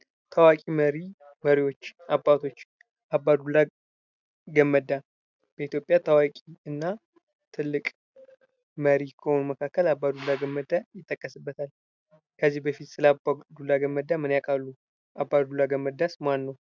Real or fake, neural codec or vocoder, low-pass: real; none; 7.2 kHz